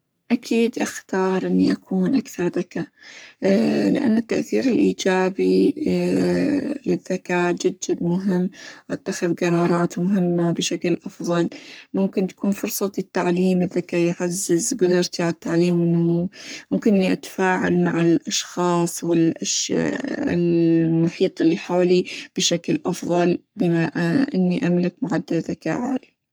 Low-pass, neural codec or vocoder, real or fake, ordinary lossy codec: none; codec, 44.1 kHz, 3.4 kbps, Pupu-Codec; fake; none